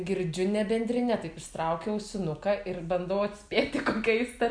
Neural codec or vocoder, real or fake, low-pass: none; real; 9.9 kHz